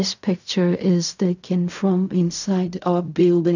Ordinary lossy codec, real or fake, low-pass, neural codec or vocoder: none; fake; 7.2 kHz; codec, 16 kHz in and 24 kHz out, 0.4 kbps, LongCat-Audio-Codec, fine tuned four codebook decoder